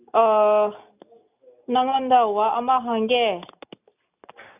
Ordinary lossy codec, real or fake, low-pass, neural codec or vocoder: none; real; 3.6 kHz; none